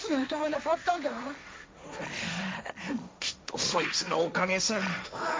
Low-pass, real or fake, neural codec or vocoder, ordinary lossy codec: none; fake; codec, 16 kHz, 1.1 kbps, Voila-Tokenizer; none